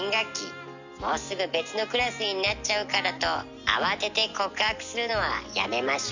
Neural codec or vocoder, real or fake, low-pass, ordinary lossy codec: none; real; 7.2 kHz; none